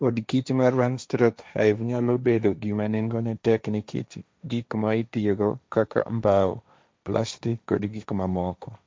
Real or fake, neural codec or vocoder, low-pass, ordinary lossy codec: fake; codec, 16 kHz, 1.1 kbps, Voila-Tokenizer; none; none